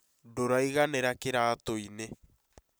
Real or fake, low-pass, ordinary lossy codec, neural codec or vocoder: real; none; none; none